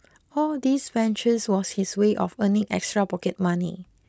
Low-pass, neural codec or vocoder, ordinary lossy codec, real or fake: none; none; none; real